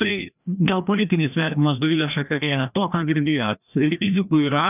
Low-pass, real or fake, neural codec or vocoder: 3.6 kHz; fake; codec, 16 kHz, 1 kbps, FreqCodec, larger model